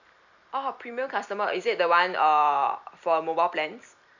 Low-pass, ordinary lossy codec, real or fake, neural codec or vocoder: 7.2 kHz; none; fake; vocoder, 44.1 kHz, 128 mel bands every 256 samples, BigVGAN v2